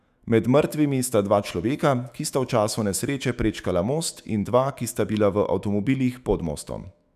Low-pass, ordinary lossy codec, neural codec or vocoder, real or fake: 14.4 kHz; none; autoencoder, 48 kHz, 128 numbers a frame, DAC-VAE, trained on Japanese speech; fake